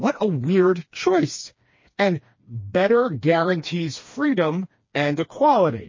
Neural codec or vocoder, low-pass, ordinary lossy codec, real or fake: codec, 16 kHz, 2 kbps, FreqCodec, smaller model; 7.2 kHz; MP3, 32 kbps; fake